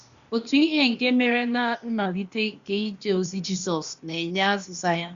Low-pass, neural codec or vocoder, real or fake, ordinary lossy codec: 7.2 kHz; codec, 16 kHz, 0.8 kbps, ZipCodec; fake; Opus, 64 kbps